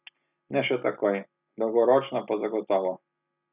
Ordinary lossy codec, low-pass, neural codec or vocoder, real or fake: none; 3.6 kHz; none; real